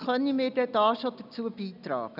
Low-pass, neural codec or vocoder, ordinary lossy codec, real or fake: 5.4 kHz; none; none; real